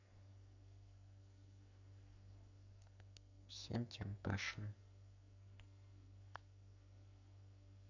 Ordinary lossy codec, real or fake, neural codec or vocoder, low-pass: none; fake; codec, 44.1 kHz, 2.6 kbps, SNAC; 7.2 kHz